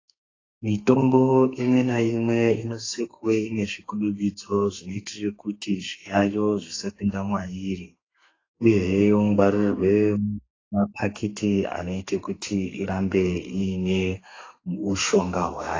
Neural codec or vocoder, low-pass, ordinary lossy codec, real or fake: codec, 32 kHz, 1.9 kbps, SNAC; 7.2 kHz; AAC, 32 kbps; fake